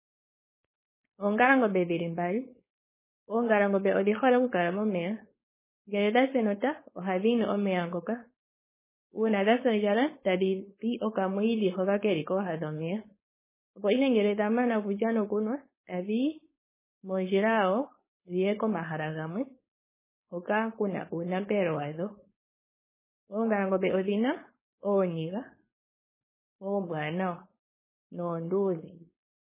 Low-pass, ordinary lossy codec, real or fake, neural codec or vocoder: 3.6 kHz; MP3, 16 kbps; fake; codec, 16 kHz, 4.8 kbps, FACodec